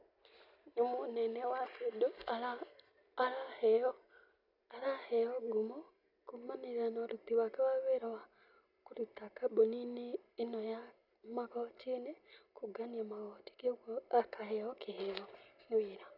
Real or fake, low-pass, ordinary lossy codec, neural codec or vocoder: real; 5.4 kHz; none; none